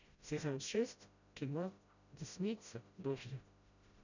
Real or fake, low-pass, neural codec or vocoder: fake; 7.2 kHz; codec, 16 kHz, 0.5 kbps, FreqCodec, smaller model